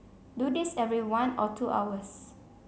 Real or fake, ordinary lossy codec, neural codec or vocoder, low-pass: real; none; none; none